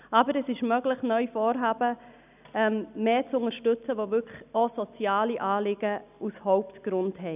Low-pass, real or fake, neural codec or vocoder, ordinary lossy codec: 3.6 kHz; real; none; none